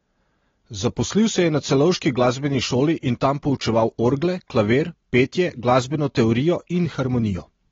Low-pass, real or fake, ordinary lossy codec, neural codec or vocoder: 7.2 kHz; real; AAC, 24 kbps; none